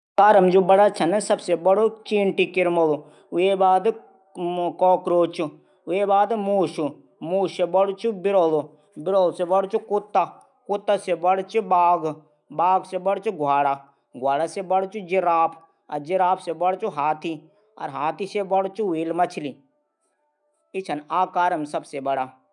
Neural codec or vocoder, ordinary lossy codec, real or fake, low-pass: autoencoder, 48 kHz, 128 numbers a frame, DAC-VAE, trained on Japanese speech; none; fake; 10.8 kHz